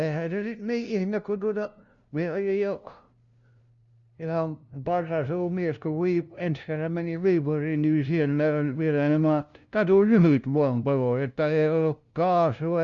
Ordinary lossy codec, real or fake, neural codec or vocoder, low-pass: Opus, 64 kbps; fake; codec, 16 kHz, 0.5 kbps, FunCodec, trained on LibriTTS, 25 frames a second; 7.2 kHz